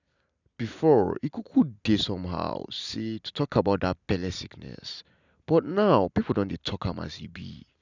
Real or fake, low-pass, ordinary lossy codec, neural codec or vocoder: real; 7.2 kHz; none; none